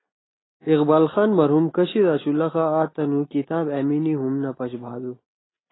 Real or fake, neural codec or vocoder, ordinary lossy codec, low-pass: real; none; AAC, 16 kbps; 7.2 kHz